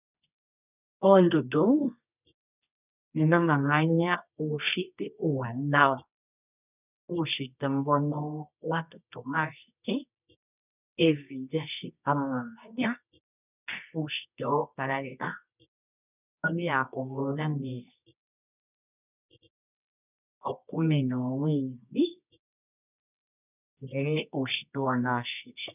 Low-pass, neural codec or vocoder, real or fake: 3.6 kHz; codec, 24 kHz, 0.9 kbps, WavTokenizer, medium music audio release; fake